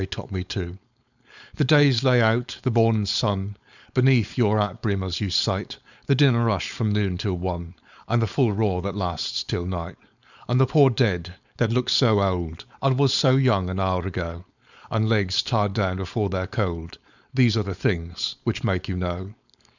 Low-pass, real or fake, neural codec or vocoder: 7.2 kHz; fake; codec, 16 kHz, 4.8 kbps, FACodec